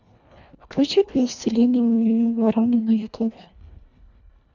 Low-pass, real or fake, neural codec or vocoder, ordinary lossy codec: 7.2 kHz; fake; codec, 24 kHz, 1.5 kbps, HILCodec; none